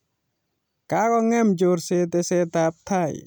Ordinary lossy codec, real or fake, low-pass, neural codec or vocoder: none; real; none; none